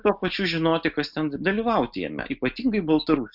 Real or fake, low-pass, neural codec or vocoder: real; 5.4 kHz; none